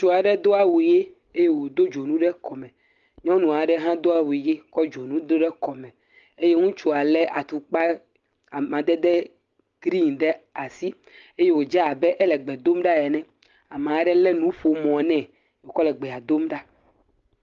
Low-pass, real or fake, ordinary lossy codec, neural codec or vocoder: 7.2 kHz; real; Opus, 32 kbps; none